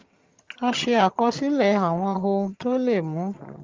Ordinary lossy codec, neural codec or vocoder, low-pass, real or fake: Opus, 32 kbps; vocoder, 22.05 kHz, 80 mel bands, HiFi-GAN; 7.2 kHz; fake